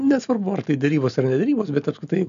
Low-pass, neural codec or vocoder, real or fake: 7.2 kHz; none; real